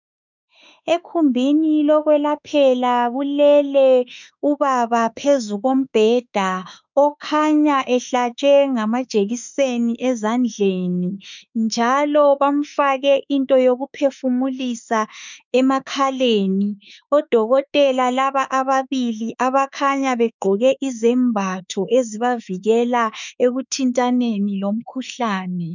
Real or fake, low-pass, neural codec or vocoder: fake; 7.2 kHz; autoencoder, 48 kHz, 32 numbers a frame, DAC-VAE, trained on Japanese speech